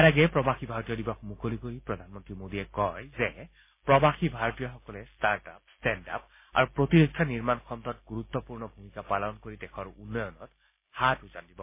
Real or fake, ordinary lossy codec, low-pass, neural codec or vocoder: real; MP3, 24 kbps; 3.6 kHz; none